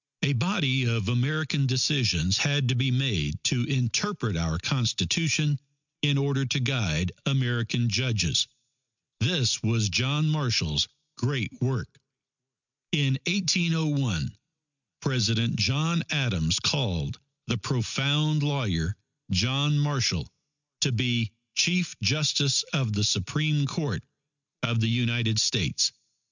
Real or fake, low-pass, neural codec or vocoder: real; 7.2 kHz; none